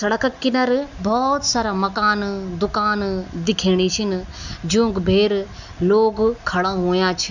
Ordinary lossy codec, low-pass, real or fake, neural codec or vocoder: none; 7.2 kHz; real; none